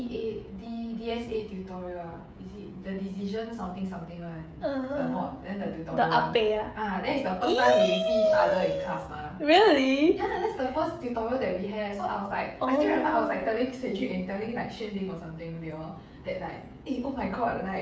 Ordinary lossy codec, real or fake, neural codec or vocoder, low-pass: none; fake; codec, 16 kHz, 16 kbps, FreqCodec, smaller model; none